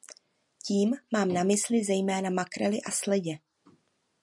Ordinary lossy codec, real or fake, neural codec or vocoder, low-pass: MP3, 96 kbps; real; none; 10.8 kHz